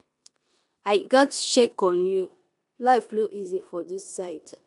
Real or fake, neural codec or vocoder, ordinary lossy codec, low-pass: fake; codec, 16 kHz in and 24 kHz out, 0.9 kbps, LongCat-Audio-Codec, four codebook decoder; MP3, 96 kbps; 10.8 kHz